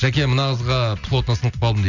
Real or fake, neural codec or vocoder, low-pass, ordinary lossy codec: real; none; 7.2 kHz; none